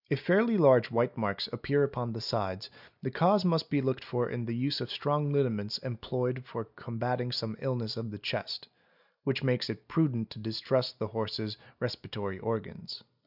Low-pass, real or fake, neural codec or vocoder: 5.4 kHz; real; none